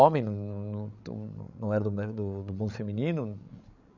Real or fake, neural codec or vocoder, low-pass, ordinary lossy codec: fake; codec, 16 kHz, 16 kbps, FreqCodec, larger model; 7.2 kHz; none